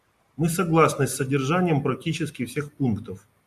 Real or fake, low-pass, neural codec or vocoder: real; 14.4 kHz; none